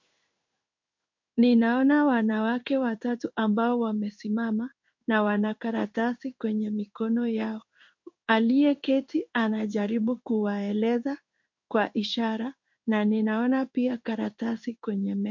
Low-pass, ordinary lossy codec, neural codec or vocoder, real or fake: 7.2 kHz; MP3, 48 kbps; codec, 16 kHz in and 24 kHz out, 1 kbps, XY-Tokenizer; fake